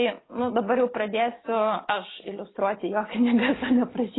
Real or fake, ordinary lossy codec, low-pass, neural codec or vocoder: real; AAC, 16 kbps; 7.2 kHz; none